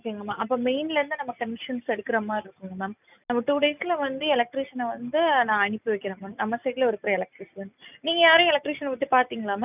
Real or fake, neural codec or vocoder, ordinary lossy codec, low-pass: real; none; none; 3.6 kHz